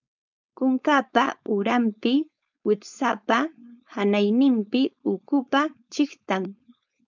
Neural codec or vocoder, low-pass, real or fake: codec, 16 kHz, 4.8 kbps, FACodec; 7.2 kHz; fake